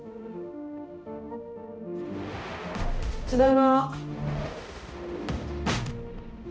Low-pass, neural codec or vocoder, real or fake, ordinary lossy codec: none; codec, 16 kHz, 0.5 kbps, X-Codec, HuBERT features, trained on balanced general audio; fake; none